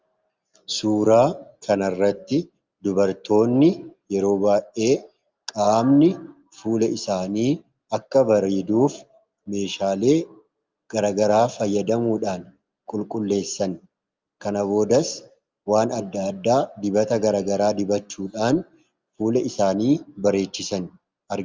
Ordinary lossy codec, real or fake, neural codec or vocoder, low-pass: Opus, 32 kbps; real; none; 7.2 kHz